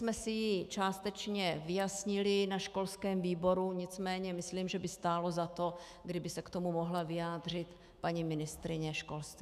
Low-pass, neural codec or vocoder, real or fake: 14.4 kHz; autoencoder, 48 kHz, 128 numbers a frame, DAC-VAE, trained on Japanese speech; fake